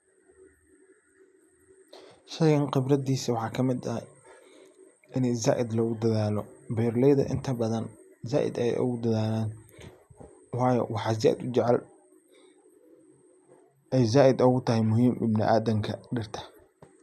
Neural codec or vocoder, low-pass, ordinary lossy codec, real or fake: none; 14.4 kHz; none; real